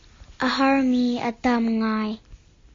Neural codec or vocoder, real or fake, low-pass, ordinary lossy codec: none; real; 7.2 kHz; AAC, 32 kbps